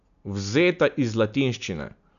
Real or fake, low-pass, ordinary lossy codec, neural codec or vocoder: real; 7.2 kHz; none; none